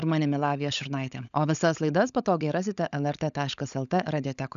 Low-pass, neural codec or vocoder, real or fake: 7.2 kHz; codec, 16 kHz, 16 kbps, FunCodec, trained on LibriTTS, 50 frames a second; fake